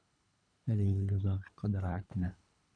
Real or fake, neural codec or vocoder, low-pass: fake; codec, 24 kHz, 3 kbps, HILCodec; 9.9 kHz